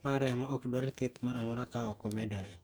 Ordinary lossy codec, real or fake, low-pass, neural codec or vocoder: none; fake; none; codec, 44.1 kHz, 2.6 kbps, DAC